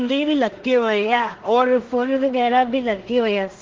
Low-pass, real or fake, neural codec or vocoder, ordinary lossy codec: 7.2 kHz; fake; codec, 16 kHz in and 24 kHz out, 0.4 kbps, LongCat-Audio-Codec, two codebook decoder; Opus, 16 kbps